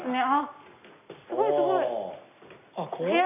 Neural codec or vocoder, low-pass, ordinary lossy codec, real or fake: none; 3.6 kHz; none; real